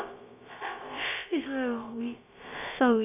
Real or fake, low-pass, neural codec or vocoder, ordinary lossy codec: fake; 3.6 kHz; codec, 16 kHz, about 1 kbps, DyCAST, with the encoder's durations; none